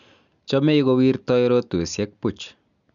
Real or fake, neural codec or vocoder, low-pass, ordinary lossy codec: real; none; 7.2 kHz; none